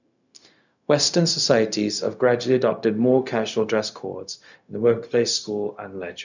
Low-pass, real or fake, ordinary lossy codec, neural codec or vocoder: 7.2 kHz; fake; none; codec, 16 kHz, 0.4 kbps, LongCat-Audio-Codec